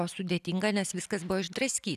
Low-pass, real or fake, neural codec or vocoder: 14.4 kHz; real; none